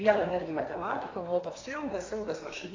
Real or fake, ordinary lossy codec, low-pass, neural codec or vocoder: fake; AAC, 32 kbps; 7.2 kHz; codec, 24 kHz, 1 kbps, SNAC